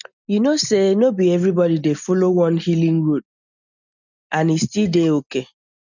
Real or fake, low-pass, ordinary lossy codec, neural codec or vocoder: real; 7.2 kHz; none; none